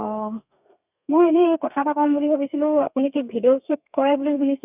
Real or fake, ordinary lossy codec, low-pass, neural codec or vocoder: fake; none; 3.6 kHz; codec, 32 kHz, 1.9 kbps, SNAC